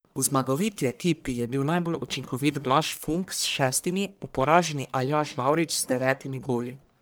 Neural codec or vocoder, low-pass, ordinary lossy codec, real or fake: codec, 44.1 kHz, 1.7 kbps, Pupu-Codec; none; none; fake